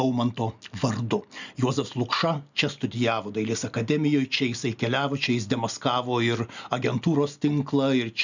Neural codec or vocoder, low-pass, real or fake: none; 7.2 kHz; real